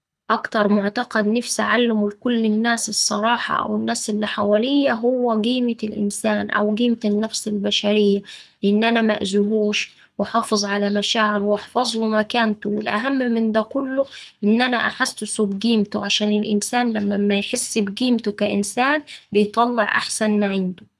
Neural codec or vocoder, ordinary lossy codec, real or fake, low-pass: codec, 24 kHz, 6 kbps, HILCodec; none; fake; none